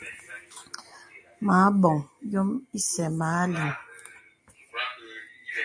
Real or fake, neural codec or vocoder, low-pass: real; none; 9.9 kHz